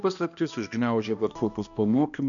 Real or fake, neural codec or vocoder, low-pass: fake; codec, 16 kHz, 1 kbps, X-Codec, HuBERT features, trained on balanced general audio; 7.2 kHz